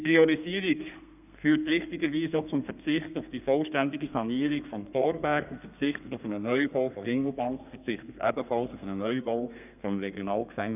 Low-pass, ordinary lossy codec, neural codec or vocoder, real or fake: 3.6 kHz; none; codec, 32 kHz, 1.9 kbps, SNAC; fake